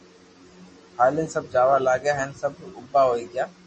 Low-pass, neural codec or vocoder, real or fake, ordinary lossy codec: 9.9 kHz; none; real; MP3, 32 kbps